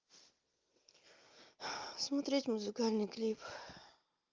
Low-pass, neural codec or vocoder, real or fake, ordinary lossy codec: 7.2 kHz; none; real; Opus, 24 kbps